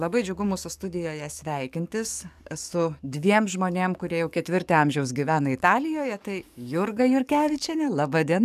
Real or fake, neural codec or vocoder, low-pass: fake; codec, 44.1 kHz, 7.8 kbps, DAC; 14.4 kHz